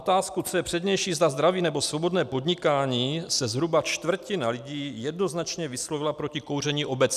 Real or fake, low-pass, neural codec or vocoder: real; 14.4 kHz; none